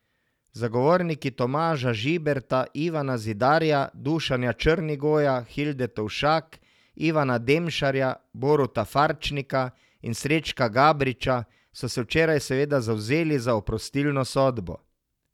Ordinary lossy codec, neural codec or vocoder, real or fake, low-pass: none; none; real; 19.8 kHz